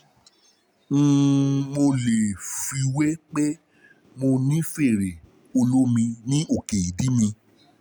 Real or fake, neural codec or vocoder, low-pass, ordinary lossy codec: real; none; none; none